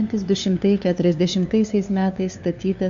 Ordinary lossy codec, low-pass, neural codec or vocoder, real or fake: Opus, 64 kbps; 7.2 kHz; codec, 16 kHz, 2 kbps, FunCodec, trained on LibriTTS, 25 frames a second; fake